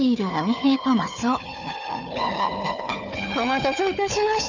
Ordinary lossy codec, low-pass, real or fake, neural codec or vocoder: none; 7.2 kHz; fake; codec, 16 kHz, 4 kbps, FunCodec, trained on Chinese and English, 50 frames a second